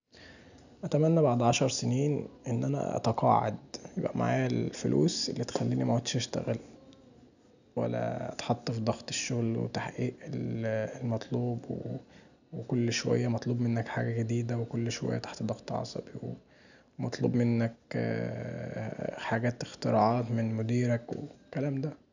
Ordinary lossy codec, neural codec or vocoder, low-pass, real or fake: none; none; 7.2 kHz; real